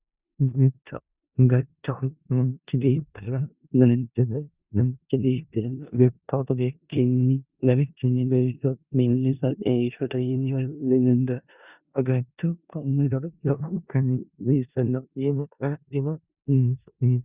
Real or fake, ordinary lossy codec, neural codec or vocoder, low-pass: fake; Opus, 64 kbps; codec, 16 kHz in and 24 kHz out, 0.4 kbps, LongCat-Audio-Codec, four codebook decoder; 3.6 kHz